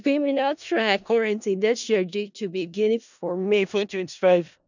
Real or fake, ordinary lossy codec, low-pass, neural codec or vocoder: fake; none; 7.2 kHz; codec, 16 kHz in and 24 kHz out, 0.4 kbps, LongCat-Audio-Codec, four codebook decoder